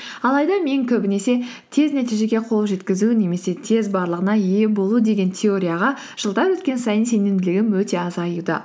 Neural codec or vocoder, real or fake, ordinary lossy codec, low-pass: none; real; none; none